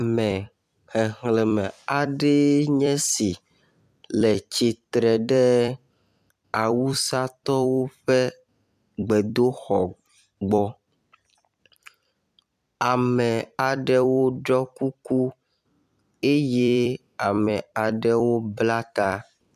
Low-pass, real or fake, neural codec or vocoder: 14.4 kHz; fake; vocoder, 44.1 kHz, 128 mel bands every 256 samples, BigVGAN v2